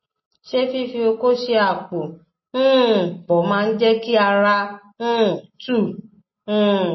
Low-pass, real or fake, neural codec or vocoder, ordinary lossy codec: 7.2 kHz; real; none; MP3, 24 kbps